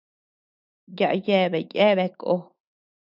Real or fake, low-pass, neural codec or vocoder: fake; 5.4 kHz; autoencoder, 48 kHz, 128 numbers a frame, DAC-VAE, trained on Japanese speech